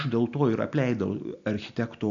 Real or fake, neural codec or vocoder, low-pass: real; none; 7.2 kHz